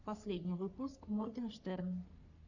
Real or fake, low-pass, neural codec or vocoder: fake; 7.2 kHz; codec, 44.1 kHz, 3.4 kbps, Pupu-Codec